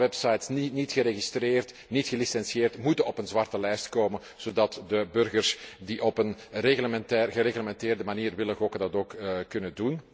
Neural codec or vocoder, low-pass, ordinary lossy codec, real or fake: none; none; none; real